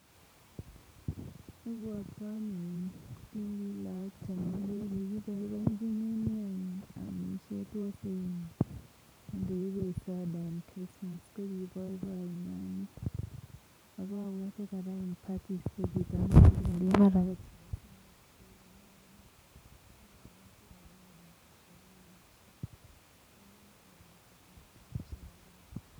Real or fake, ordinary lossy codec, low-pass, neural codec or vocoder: fake; none; none; vocoder, 44.1 kHz, 128 mel bands every 512 samples, BigVGAN v2